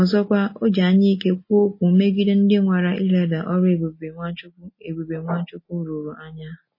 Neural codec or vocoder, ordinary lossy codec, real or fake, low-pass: none; MP3, 24 kbps; real; 5.4 kHz